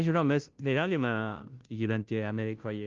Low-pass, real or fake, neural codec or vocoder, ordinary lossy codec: 7.2 kHz; fake; codec, 16 kHz, 0.5 kbps, FunCodec, trained on Chinese and English, 25 frames a second; Opus, 32 kbps